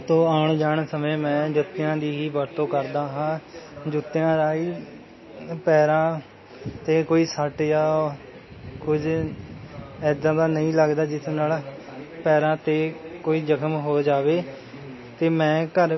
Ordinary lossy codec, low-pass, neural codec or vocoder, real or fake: MP3, 24 kbps; 7.2 kHz; none; real